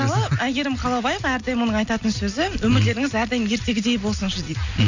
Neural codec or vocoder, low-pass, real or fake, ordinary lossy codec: none; 7.2 kHz; real; none